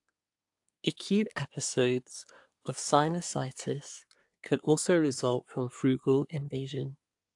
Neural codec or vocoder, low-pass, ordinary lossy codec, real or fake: codec, 24 kHz, 1 kbps, SNAC; 10.8 kHz; AAC, 64 kbps; fake